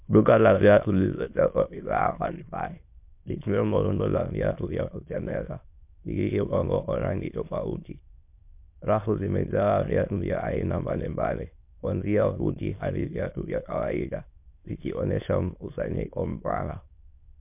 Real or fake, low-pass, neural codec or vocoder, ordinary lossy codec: fake; 3.6 kHz; autoencoder, 22.05 kHz, a latent of 192 numbers a frame, VITS, trained on many speakers; MP3, 32 kbps